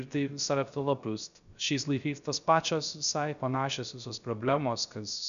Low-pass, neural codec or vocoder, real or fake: 7.2 kHz; codec, 16 kHz, 0.3 kbps, FocalCodec; fake